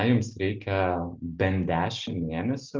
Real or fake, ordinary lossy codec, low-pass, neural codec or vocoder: real; Opus, 24 kbps; 7.2 kHz; none